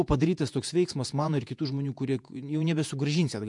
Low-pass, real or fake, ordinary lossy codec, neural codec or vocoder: 10.8 kHz; fake; MP3, 64 kbps; vocoder, 48 kHz, 128 mel bands, Vocos